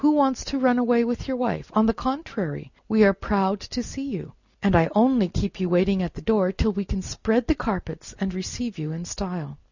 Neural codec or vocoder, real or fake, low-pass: none; real; 7.2 kHz